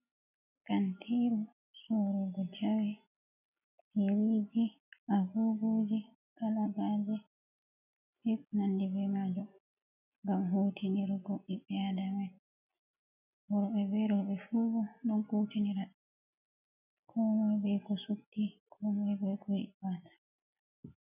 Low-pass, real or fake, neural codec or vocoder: 3.6 kHz; real; none